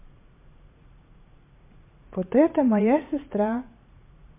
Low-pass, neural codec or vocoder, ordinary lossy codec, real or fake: 3.6 kHz; vocoder, 44.1 kHz, 80 mel bands, Vocos; MP3, 32 kbps; fake